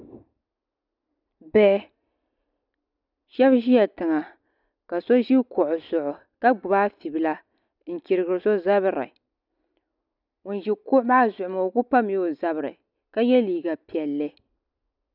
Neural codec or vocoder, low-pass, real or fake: none; 5.4 kHz; real